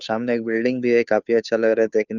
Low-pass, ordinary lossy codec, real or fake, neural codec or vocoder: 7.2 kHz; none; fake; codec, 16 kHz, 2 kbps, FunCodec, trained on Chinese and English, 25 frames a second